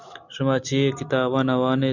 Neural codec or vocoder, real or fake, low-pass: none; real; 7.2 kHz